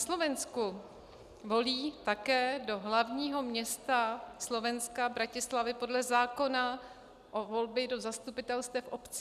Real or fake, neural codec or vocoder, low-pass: real; none; 14.4 kHz